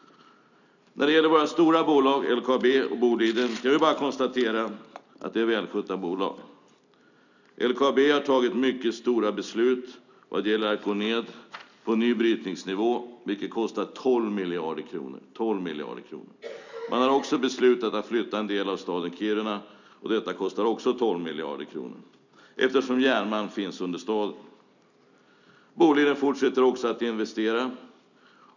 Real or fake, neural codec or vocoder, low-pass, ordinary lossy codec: real; none; 7.2 kHz; none